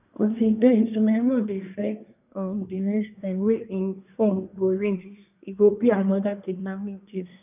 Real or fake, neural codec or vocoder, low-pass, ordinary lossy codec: fake; codec, 24 kHz, 1 kbps, SNAC; 3.6 kHz; none